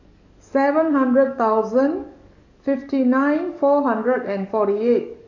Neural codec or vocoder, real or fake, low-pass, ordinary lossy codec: codec, 44.1 kHz, 7.8 kbps, DAC; fake; 7.2 kHz; none